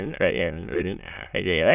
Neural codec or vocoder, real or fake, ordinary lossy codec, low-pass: autoencoder, 22.05 kHz, a latent of 192 numbers a frame, VITS, trained on many speakers; fake; none; 3.6 kHz